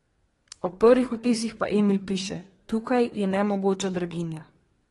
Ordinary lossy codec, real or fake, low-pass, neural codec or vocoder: AAC, 32 kbps; fake; 10.8 kHz; codec, 24 kHz, 1 kbps, SNAC